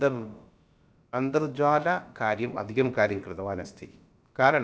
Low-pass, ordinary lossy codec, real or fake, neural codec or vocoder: none; none; fake; codec, 16 kHz, about 1 kbps, DyCAST, with the encoder's durations